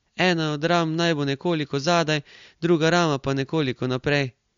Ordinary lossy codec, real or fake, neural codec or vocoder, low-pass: MP3, 48 kbps; real; none; 7.2 kHz